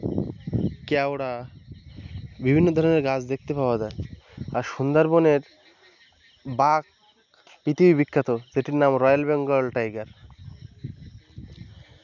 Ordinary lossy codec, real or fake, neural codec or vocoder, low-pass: none; real; none; 7.2 kHz